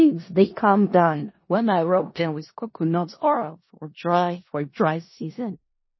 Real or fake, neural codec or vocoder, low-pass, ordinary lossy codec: fake; codec, 16 kHz in and 24 kHz out, 0.4 kbps, LongCat-Audio-Codec, four codebook decoder; 7.2 kHz; MP3, 24 kbps